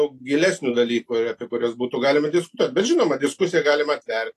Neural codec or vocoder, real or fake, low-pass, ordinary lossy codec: none; real; 14.4 kHz; AAC, 48 kbps